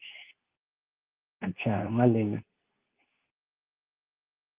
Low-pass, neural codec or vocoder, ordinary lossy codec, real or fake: 3.6 kHz; codec, 24 kHz, 0.9 kbps, WavTokenizer, medium speech release version 2; Opus, 32 kbps; fake